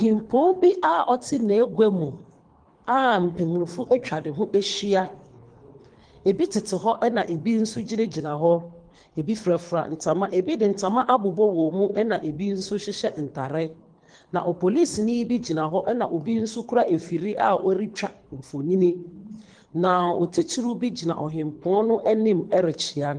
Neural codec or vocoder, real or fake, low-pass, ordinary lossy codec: codec, 24 kHz, 3 kbps, HILCodec; fake; 9.9 kHz; Opus, 24 kbps